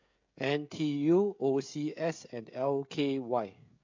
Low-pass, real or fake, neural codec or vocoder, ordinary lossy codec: 7.2 kHz; fake; codec, 16 kHz, 16 kbps, FreqCodec, smaller model; MP3, 48 kbps